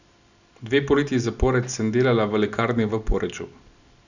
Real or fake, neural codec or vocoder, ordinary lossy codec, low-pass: real; none; none; 7.2 kHz